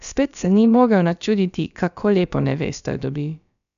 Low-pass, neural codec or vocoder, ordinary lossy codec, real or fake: 7.2 kHz; codec, 16 kHz, about 1 kbps, DyCAST, with the encoder's durations; none; fake